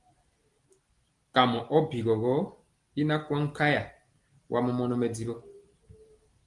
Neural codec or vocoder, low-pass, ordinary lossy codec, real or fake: none; 10.8 kHz; Opus, 24 kbps; real